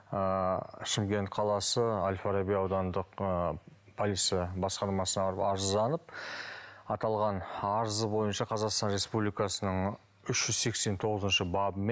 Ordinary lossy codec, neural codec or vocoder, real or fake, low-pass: none; none; real; none